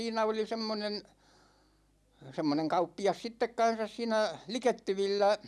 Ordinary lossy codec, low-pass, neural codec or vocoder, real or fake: none; none; none; real